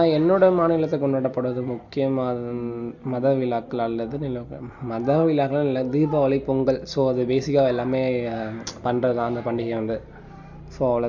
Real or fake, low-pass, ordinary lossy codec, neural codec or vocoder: real; 7.2 kHz; none; none